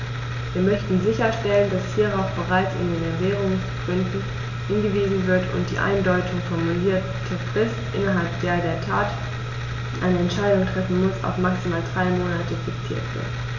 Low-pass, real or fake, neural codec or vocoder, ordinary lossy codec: 7.2 kHz; real; none; none